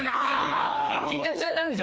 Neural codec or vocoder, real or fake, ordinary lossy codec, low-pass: codec, 16 kHz, 2 kbps, FreqCodec, larger model; fake; none; none